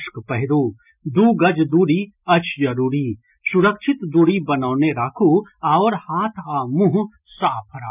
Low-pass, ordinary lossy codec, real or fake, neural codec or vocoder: 3.6 kHz; none; real; none